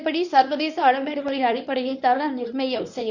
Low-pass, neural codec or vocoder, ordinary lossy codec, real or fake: 7.2 kHz; codec, 24 kHz, 0.9 kbps, WavTokenizer, medium speech release version 1; none; fake